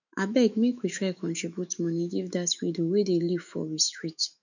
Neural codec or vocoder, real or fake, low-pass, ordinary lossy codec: autoencoder, 48 kHz, 128 numbers a frame, DAC-VAE, trained on Japanese speech; fake; 7.2 kHz; none